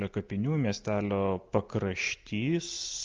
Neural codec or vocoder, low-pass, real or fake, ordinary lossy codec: none; 7.2 kHz; real; Opus, 24 kbps